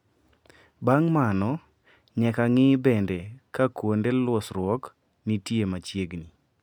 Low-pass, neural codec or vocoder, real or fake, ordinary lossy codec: 19.8 kHz; none; real; none